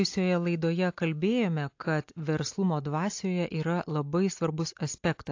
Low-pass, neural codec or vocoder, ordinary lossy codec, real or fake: 7.2 kHz; none; AAC, 48 kbps; real